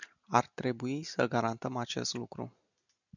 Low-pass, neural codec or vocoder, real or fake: 7.2 kHz; none; real